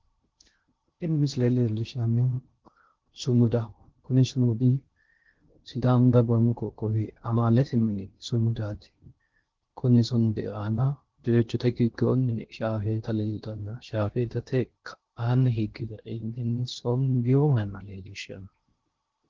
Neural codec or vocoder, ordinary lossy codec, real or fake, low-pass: codec, 16 kHz in and 24 kHz out, 0.6 kbps, FocalCodec, streaming, 2048 codes; Opus, 16 kbps; fake; 7.2 kHz